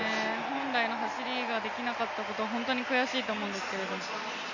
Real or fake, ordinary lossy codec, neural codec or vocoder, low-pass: real; none; none; 7.2 kHz